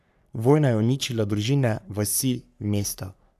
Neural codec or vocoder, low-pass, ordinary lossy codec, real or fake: codec, 44.1 kHz, 3.4 kbps, Pupu-Codec; 14.4 kHz; none; fake